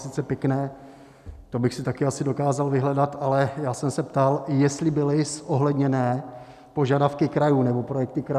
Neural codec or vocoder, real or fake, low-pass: none; real; 14.4 kHz